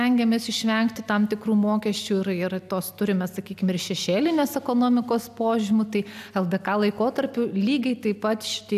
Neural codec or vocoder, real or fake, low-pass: none; real; 14.4 kHz